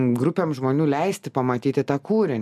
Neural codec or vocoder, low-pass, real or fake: none; 14.4 kHz; real